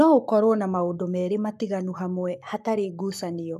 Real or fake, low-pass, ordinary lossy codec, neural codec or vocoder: fake; 14.4 kHz; none; codec, 44.1 kHz, 7.8 kbps, Pupu-Codec